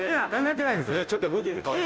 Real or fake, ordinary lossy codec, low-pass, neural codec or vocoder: fake; none; none; codec, 16 kHz, 0.5 kbps, FunCodec, trained on Chinese and English, 25 frames a second